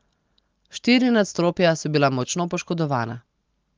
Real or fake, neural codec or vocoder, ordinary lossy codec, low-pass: real; none; Opus, 24 kbps; 7.2 kHz